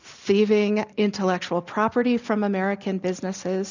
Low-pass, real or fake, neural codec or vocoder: 7.2 kHz; real; none